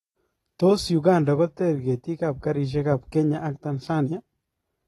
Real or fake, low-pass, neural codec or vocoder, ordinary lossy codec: real; 19.8 kHz; none; AAC, 32 kbps